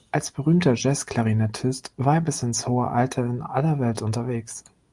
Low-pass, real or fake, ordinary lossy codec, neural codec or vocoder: 10.8 kHz; real; Opus, 32 kbps; none